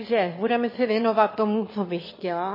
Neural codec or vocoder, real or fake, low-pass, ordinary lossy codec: autoencoder, 22.05 kHz, a latent of 192 numbers a frame, VITS, trained on one speaker; fake; 5.4 kHz; MP3, 24 kbps